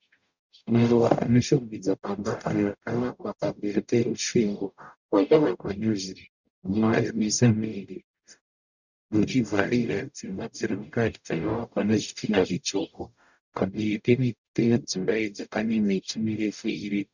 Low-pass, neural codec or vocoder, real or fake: 7.2 kHz; codec, 44.1 kHz, 0.9 kbps, DAC; fake